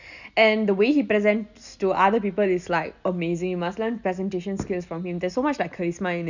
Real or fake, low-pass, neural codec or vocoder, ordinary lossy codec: real; 7.2 kHz; none; none